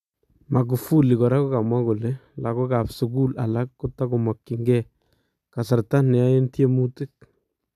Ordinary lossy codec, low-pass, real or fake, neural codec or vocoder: none; 14.4 kHz; real; none